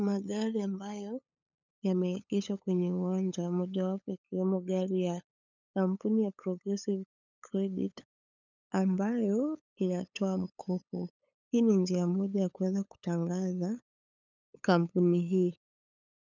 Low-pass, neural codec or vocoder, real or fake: 7.2 kHz; codec, 16 kHz, 8 kbps, FunCodec, trained on LibriTTS, 25 frames a second; fake